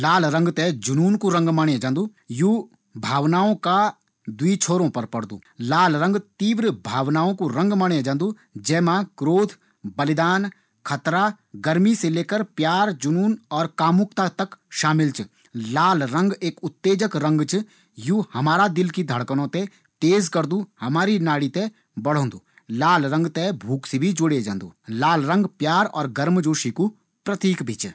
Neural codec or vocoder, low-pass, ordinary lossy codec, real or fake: none; none; none; real